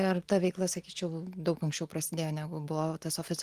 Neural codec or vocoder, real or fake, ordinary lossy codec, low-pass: none; real; Opus, 24 kbps; 14.4 kHz